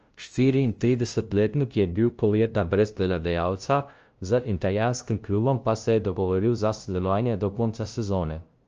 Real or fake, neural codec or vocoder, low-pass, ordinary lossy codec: fake; codec, 16 kHz, 0.5 kbps, FunCodec, trained on LibriTTS, 25 frames a second; 7.2 kHz; Opus, 24 kbps